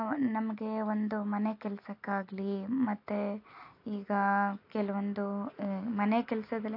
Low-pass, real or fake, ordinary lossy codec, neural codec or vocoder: 5.4 kHz; real; AAC, 32 kbps; none